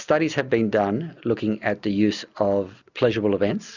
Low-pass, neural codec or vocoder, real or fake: 7.2 kHz; none; real